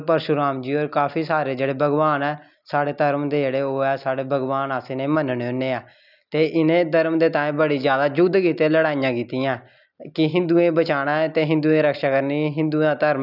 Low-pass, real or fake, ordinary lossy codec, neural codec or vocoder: 5.4 kHz; real; none; none